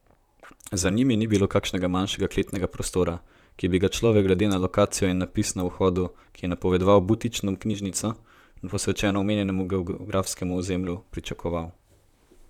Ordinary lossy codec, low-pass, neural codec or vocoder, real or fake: none; 19.8 kHz; vocoder, 44.1 kHz, 128 mel bands, Pupu-Vocoder; fake